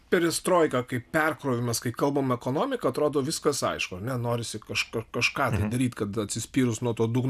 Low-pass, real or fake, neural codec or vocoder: 14.4 kHz; real; none